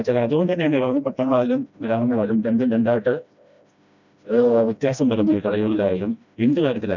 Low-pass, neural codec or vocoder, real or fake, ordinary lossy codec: 7.2 kHz; codec, 16 kHz, 1 kbps, FreqCodec, smaller model; fake; none